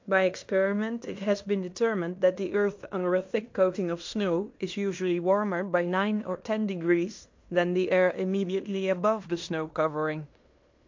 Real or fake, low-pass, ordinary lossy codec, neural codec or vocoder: fake; 7.2 kHz; MP3, 48 kbps; codec, 16 kHz in and 24 kHz out, 0.9 kbps, LongCat-Audio-Codec, fine tuned four codebook decoder